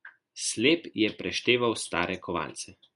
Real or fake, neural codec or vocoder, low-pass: real; none; 10.8 kHz